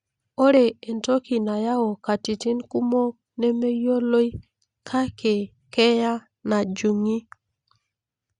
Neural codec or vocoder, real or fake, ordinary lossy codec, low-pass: none; real; Opus, 64 kbps; 9.9 kHz